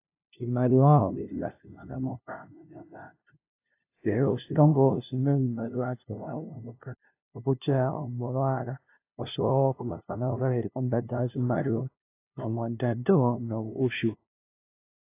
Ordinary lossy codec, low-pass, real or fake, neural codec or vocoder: AAC, 24 kbps; 3.6 kHz; fake; codec, 16 kHz, 0.5 kbps, FunCodec, trained on LibriTTS, 25 frames a second